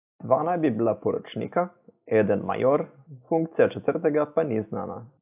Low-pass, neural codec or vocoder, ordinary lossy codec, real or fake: 3.6 kHz; none; AAC, 32 kbps; real